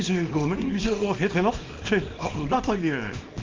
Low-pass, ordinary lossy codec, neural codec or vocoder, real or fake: 7.2 kHz; Opus, 24 kbps; codec, 24 kHz, 0.9 kbps, WavTokenizer, small release; fake